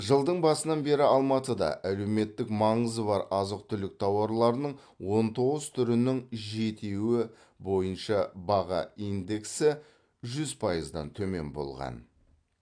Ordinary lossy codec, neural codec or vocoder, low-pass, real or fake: AAC, 64 kbps; none; 9.9 kHz; real